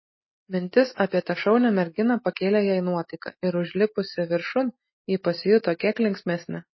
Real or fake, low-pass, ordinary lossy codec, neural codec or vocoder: real; 7.2 kHz; MP3, 24 kbps; none